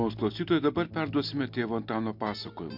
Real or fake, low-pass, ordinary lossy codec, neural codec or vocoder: real; 5.4 kHz; MP3, 48 kbps; none